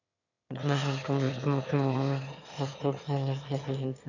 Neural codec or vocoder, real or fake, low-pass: autoencoder, 22.05 kHz, a latent of 192 numbers a frame, VITS, trained on one speaker; fake; 7.2 kHz